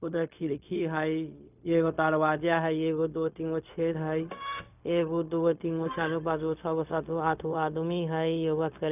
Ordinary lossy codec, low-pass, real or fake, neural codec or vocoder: none; 3.6 kHz; fake; codec, 16 kHz, 0.4 kbps, LongCat-Audio-Codec